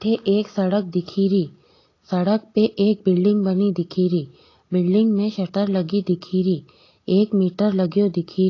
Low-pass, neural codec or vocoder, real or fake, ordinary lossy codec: 7.2 kHz; none; real; AAC, 32 kbps